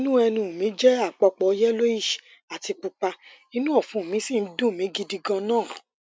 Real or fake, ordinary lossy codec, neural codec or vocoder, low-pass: real; none; none; none